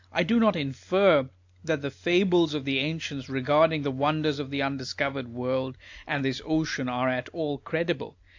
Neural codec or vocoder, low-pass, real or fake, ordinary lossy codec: none; 7.2 kHz; real; AAC, 48 kbps